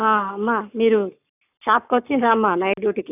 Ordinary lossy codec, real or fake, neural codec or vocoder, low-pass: none; fake; codec, 44.1 kHz, 7.8 kbps, Pupu-Codec; 3.6 kHz